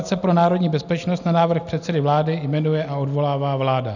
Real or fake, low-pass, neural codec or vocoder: real; 7.2 kHz; none